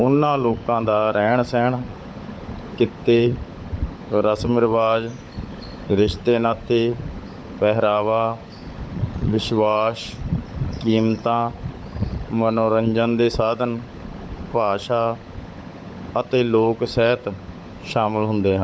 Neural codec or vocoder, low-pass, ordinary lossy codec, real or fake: codec, 16 kHz, 16 kbps, FunCodec, trained on LibriTTS, 50 frames a second; none; none; fake